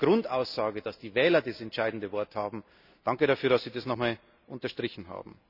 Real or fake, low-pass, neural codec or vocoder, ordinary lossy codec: real; 5.4 kHz; none; none